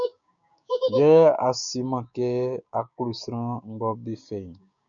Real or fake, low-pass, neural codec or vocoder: fake; 7.2 kHz; codec, 16 kHz, 6 kbps, DAC